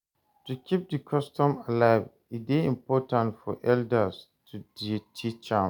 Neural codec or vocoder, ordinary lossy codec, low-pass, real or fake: none; none; 19.8 kHz; real